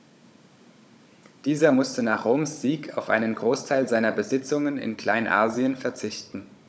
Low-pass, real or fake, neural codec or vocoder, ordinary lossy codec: none; fake; codec, 16 kHz, 16 kbps, FunCodec, trained on Chinese and English, 50 frames a second; none